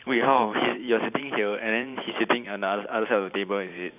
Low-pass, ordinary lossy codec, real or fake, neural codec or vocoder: 3.6 kHz; none; fake; vocoder, 44.1 kHz, 128 mel bands every 512 samples, BigVGAN v2